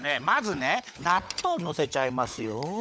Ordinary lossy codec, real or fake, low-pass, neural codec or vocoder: none; fake; none; codec, 16 kHz, 16 kbps, FunCodec, trained on LibriTTS, 50 frames a second